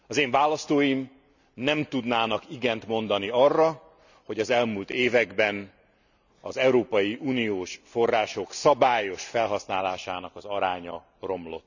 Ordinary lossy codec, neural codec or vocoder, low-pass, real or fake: none; none; 7.2 kHz; real